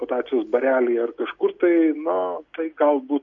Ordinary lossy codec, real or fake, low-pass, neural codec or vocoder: MP3, 48 kbps; real; 7.2 kHz; none